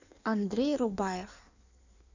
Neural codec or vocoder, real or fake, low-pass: codec, 16 kHz in and 24 kHz out, 1.1 kbps, FireRedTTS-2 codec; fake; 7.2 kHz